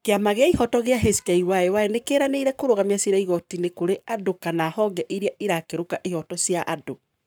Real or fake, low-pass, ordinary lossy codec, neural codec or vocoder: fake; none; none; codec, 44.1 kHz, 7.8 kbps, Pupu-Codec